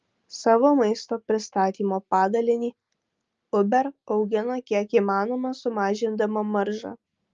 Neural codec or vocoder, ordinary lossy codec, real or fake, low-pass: none; Opus, 24 kbps; real; 7.2 kHz